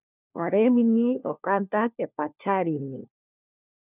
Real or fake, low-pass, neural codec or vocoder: fake; 3.6 kHz; codec, 16 kHz, 1 kbps, FunCodec, trained on LibriTTS, 50 frames a second